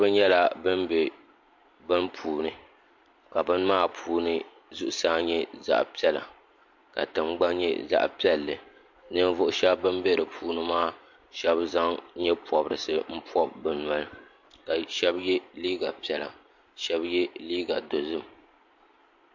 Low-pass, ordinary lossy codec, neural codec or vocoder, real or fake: 7.2 kHz; MP3, 48 kbps; none; real